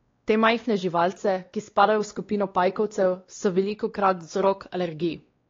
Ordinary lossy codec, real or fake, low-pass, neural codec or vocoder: AAC, 32 kbps; fake; 7.2 kHz; codec, 16 kHz, 2 kbps, X-Codec, WavLM features, trained on Multilingual LibriSpeech